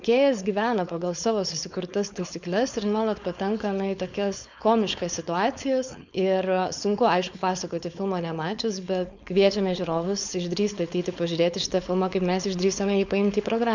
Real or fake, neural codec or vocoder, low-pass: fake; codec, 16 kHz, 4.8 kbps, FACodec; 7.2 kHz